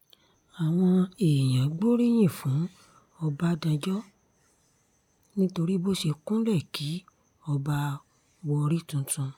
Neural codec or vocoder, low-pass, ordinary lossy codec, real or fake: none; 19.8 kHz; none; real